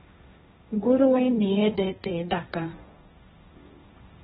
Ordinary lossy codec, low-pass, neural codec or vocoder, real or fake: AAC, 16 kbps; 7.2 kHz; codec, 16 kHz, 1.1 kbps, Voila-Tokenizer; fake